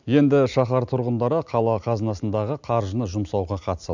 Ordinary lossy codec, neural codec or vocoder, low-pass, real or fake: none; none; 7.2 kHz; real